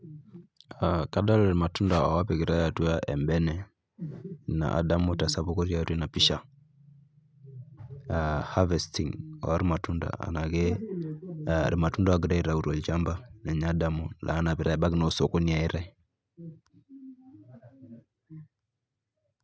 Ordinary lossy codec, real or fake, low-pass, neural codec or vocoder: none; real; none; none